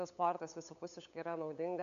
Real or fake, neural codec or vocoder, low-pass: fake; codec, 16 kHz, 8 kbps, FunCodec, trained on LibriTTS, 25 frames a second; 7.2 kHz